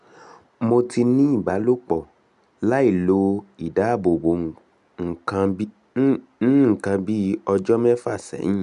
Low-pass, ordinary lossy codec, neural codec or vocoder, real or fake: 10.8 kHz; none; none; real